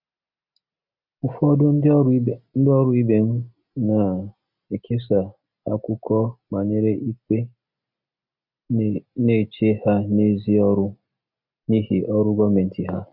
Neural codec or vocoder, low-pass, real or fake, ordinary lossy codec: none; 5.4 kHz; real; none